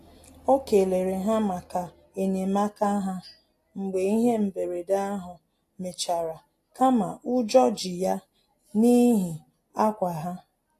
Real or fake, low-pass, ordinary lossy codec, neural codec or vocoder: real; 14.4 kHz; AAC, 48 kbps; none